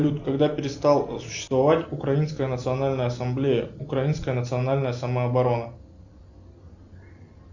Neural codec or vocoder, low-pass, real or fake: none; 7.2 kHz; real